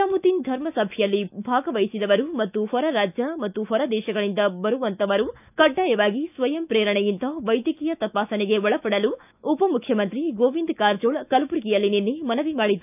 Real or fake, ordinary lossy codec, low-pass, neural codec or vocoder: fake; none; 3.6 kHz; autoencoder, 48 kHz, 128 numbers a frame, DAC-VAE, trained on Japanese speech